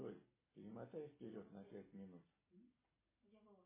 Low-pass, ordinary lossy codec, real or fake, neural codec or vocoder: 3.6 kHz; MP3, 16 kbps; real; none